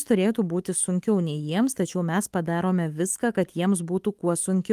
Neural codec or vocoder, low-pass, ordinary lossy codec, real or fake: autoencoder, 48 kHz, 128 numbers a frame, DAC-VAE, trained on Japanese speech; 14.4 kHz; Opus, 24 kbps; fake